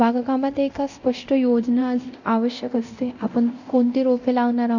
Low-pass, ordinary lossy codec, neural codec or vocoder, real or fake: 7.2 kHz; none; codec, 24 kHz, 0.9 kbps, DualCodec; fake